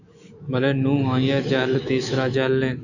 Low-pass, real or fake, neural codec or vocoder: 7.2 kHz; fake; autoencoder, 48 kHz, 128 numbers a frame, DAC-VAE, trained on Japanese speech